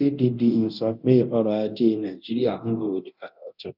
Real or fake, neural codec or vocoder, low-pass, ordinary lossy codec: fake; codec, 24 kHz, 0.9 kbps, DualCodec; 5.4 kHz; none